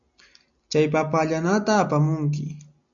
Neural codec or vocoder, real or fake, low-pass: none; real; 7.2 kHz